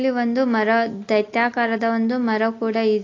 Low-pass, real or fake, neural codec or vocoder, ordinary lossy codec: 7.2 kHz; real; none; AAC, 32 kbps